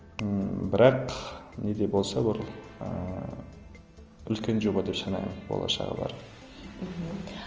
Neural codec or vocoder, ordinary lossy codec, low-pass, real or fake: none; Opus, 24 kbps; 7.2 kHz; real